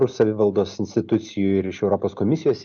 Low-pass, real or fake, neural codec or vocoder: 7.2 kHz; real; none